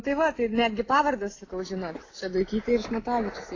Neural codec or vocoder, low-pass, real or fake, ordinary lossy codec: none; 7.2 kHz; real; AAC, 32 kbps